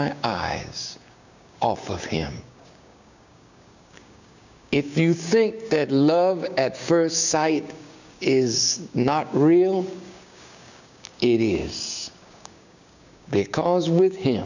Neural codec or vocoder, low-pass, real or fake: codec, 16 kHz, 6 kbps, DAC; 7.2 kHz; fake